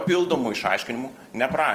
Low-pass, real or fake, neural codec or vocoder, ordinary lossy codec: 14.4 kHz; fake; vocoder, 44.1 kHz, 128 mel bands every 256 samples, BigVGAN v2; Opus, 24 kbps